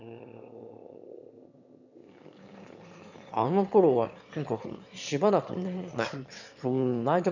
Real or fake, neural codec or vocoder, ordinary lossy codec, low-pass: fake; autoencoder, 22.05 kHz, a latent of 192 numbers a frame, VITS, trained on one speaker; none; 7.2 kHz